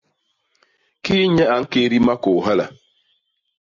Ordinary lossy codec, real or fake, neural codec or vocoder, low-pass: AAC, 48 kbps; real; none; 7.2 kHz